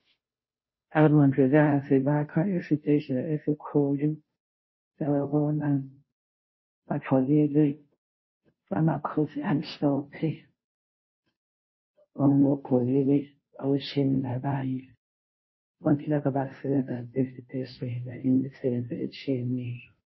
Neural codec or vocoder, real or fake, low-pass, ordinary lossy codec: codec, 16 kHz, 0.5 kbps, FunCodec, trained on Chinese and English, 25 frames a second; fake; 7.2 kHz; MP3, 24 kbps